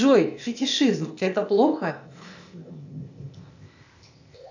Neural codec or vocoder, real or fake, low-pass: codec, 16 kHz, 0.8 kbps, ZipCodec; fake; 7.2 kHz